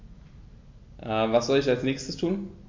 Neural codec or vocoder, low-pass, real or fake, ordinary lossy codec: none; 7.2 kHz; real; MP3, 48 kbps